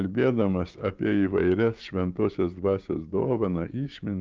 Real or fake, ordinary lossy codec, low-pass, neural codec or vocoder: fake; Opus, 24 kbps; 7.2 kHz; codec, 16 kHz, 6 kbps, DAC